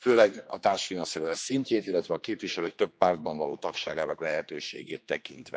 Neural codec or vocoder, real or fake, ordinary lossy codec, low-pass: codec, 16 kHz, 2 kbps, X-Codec, HuBERT features, trained on general audio; fake; none; none